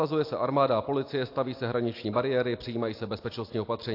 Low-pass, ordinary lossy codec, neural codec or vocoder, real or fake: 5.4 kHz; AAC, 32 kbps; none; real